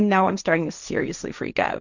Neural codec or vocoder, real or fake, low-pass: codec, 16 kHz, 1.1 kbps, Voila-Tokenizer; fake; 7.2 kHz